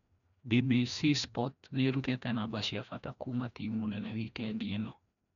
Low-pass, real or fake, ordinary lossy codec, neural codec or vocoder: 7.2 kHz; fake; none; codec, 16 kHz, 1 kbps, FreqCodec, larger model